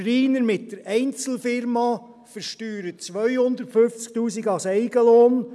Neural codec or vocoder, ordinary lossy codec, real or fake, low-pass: none; none; real; none